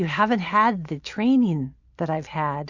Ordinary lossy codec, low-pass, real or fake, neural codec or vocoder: Opus, 64 kbps; 7.2 kHz; real; none